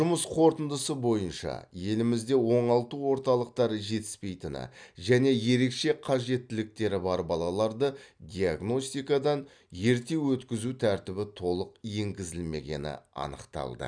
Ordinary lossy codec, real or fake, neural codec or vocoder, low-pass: none; real; none; 9.9 kHz